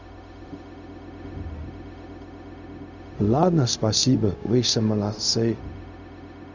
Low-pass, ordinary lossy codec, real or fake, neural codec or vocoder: 7.2 kHz; none; fake; codec, 16 kHz, 0.4 kbps, LongCat-Audio-Codec